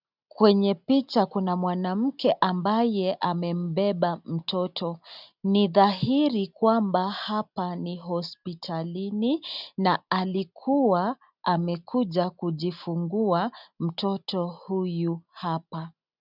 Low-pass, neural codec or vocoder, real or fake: 5.4 kHz; none; real